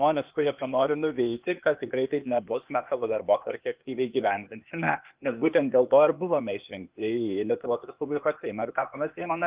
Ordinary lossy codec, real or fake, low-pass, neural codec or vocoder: Opus, 24 kbps; fake; 3.6 kHz; codec, 16 kHz, 0.8 kbps, ZipCodec